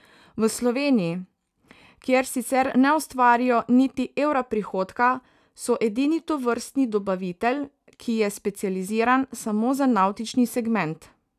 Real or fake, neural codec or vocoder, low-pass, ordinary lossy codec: real; none; 14.4 kHz; none